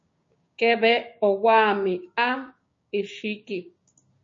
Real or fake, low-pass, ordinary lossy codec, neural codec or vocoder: fake; 7.2 kHz; MP3, 32 kbps; codec, 16 kHz, 6 kbps, DAC